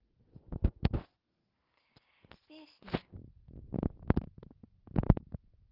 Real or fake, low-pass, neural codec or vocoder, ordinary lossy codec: real; 5.4 kHz; none; Opus, 32 kbps